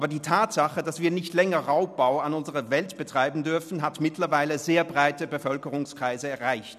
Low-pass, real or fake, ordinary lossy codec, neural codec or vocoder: 14.4 kHz; real; none; none